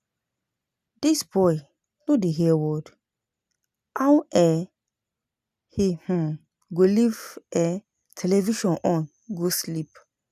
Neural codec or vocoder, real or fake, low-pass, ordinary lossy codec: none; real; 14.4 kHz; none